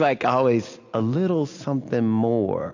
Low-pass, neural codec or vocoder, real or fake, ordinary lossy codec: 7.2 kHz; none; real; AAC, 48 kbps